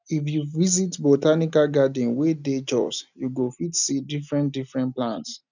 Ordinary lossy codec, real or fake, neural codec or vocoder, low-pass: none; real; none; 7.2 kHz